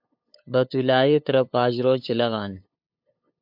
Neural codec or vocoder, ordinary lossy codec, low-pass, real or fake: codec, 16 kHz, 2 kbps, FunCodec, trained on LibriTTS, 25 frames a second; AAC, 48 kbps; 5.4 kHz; fake